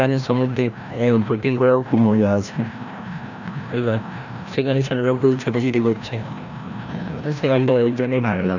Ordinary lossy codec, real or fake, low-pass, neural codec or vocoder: none; fake; 7.2 kHz; codec, 16 kHz, 1 kbps, FreqCodec, larger model